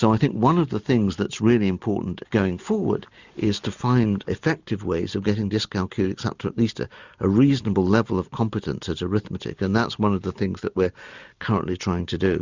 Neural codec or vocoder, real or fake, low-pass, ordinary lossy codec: none; real; 7.2 kHz; Opus, 64 kbps